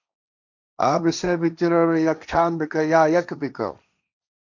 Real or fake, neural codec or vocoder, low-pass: fake; codec, 16 kHz, 1.1 kbps, Voila-Tokenizer; 7.2 kHz